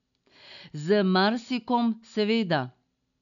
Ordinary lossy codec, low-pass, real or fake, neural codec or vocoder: none; 7.2 kHz; real; none